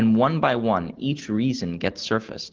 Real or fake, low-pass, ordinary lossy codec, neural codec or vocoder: real; 7.2 kHz; Opus, 16 kbps; none